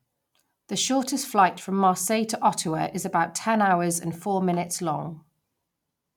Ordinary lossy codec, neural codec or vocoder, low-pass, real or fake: none; none; 19.8 kHz; real